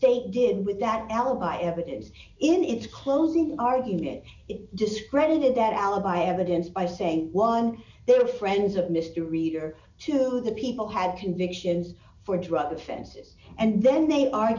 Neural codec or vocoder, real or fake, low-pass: none; real; 7.2 kHz